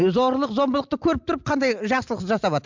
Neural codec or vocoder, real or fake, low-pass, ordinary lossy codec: none; real; 7.2 kHz; MP3, 64 kbps